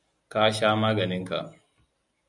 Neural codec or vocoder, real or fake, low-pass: none; real; 10.8 kHz